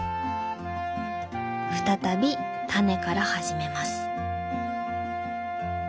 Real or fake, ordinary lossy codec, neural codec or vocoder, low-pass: real; none; none; none